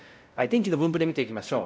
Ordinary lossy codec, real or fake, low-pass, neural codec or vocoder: none; fake; none; codec, 16 kHz, 0.5 kbps, X-Codec, WavLM features, trained on Multilingual LibriSpeech